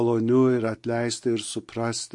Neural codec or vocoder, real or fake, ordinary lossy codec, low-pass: none; real; MP3, 48 kbps; 10.8 kHz